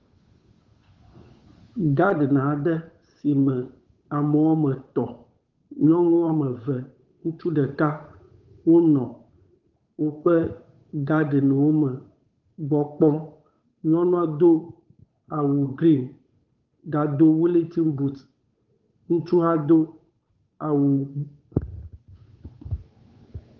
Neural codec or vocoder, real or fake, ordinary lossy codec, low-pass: codec, 16 kHz, 8 kbps, FunCodec, trained on Chinese and English, 25 frames a second; fake; Opus, 32 kbps; 7.2 kHz